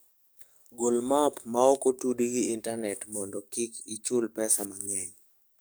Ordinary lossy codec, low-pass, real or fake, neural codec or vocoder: none; none; fake; codec, 44.1 kHz, 7.8 kbps, DAC